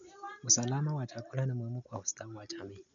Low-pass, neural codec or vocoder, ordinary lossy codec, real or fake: 7.2 kHz; none; none; real